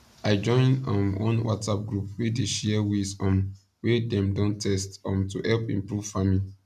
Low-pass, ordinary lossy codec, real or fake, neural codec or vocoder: 14.4 kHz; none; real; none